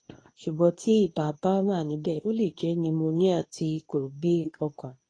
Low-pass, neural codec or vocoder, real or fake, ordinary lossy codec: 9.9 kHz; codec, 24 kHz, 0.9 kbps, WavTokenizer, medium speech release version 2; fake; AAC, 32 kbps